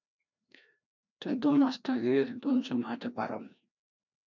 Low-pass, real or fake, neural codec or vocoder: 7.2 kHz; fake; codec, 16 kHz, 1 kbps, FreqCodec, larger model